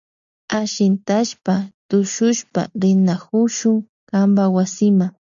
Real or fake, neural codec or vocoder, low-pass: real; none; 7.2 kHz